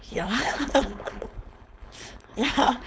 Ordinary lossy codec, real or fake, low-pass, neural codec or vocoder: none; fake; none; codec, 16 kHz, 4.8 kbps, FACodec